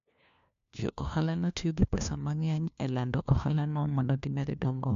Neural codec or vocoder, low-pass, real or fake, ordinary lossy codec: codec, 16 kHz, 1 kbps, FunCodec, trained on LibriTTS, 50 frames a second; 7.2 kHz; fake; AAC, 96 kbps